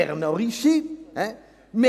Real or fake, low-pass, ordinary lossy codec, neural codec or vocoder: real; 14.4 kHz; none; none